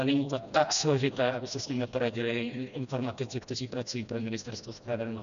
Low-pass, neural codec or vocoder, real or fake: 7.2 kHz; codec, 16 kHz, 1 kbps, FreqCodec, smaller model; fake